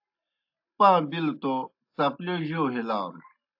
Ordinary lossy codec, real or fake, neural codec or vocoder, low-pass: AAC, 48 kbps; real; none; 5.4 kHz